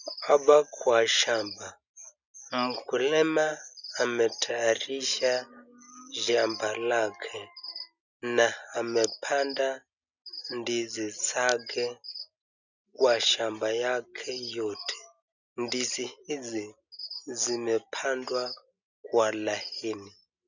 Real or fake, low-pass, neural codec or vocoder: real; 7.2 kHz; none